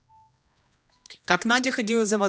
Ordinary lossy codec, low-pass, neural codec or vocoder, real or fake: none; none; codec, 16 kHz, 1 kbps, X-Codec, HuBERT features, trained on general audio; fake